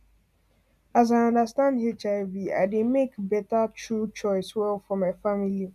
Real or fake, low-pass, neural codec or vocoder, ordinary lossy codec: real; 14.4 kHz; none; none